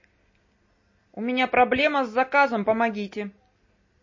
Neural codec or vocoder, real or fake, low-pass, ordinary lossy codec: none; real; 7.2 kHz; MP3, 32 kbps